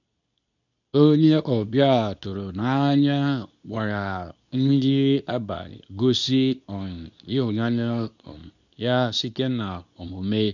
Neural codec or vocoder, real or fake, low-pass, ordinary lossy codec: codec, 24 kHz, 0.9 kbps, WavTokenizer, medium speech release version 2; fake; 7.2 kHz; none